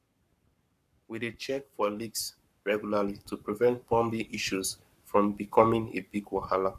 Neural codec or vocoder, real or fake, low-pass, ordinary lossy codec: codec, 44.1 kHz, 7.8 kbps, Pupu-Codec; fake; 14.4 kHz; none